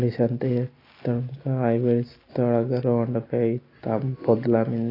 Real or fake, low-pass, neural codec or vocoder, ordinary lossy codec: real; 5.4 kHz; none; AAC, 24 kbps